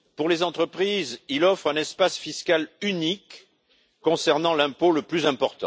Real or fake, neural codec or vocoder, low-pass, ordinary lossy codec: real; none; none; none